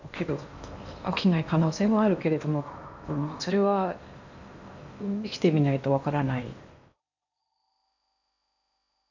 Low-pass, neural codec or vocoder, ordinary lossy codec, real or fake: 7.2 kHz; codec, 16 kHz in and 24 kHz out, 0.8 kbps, FocalCodec, streaming, 65536 codes; none; fake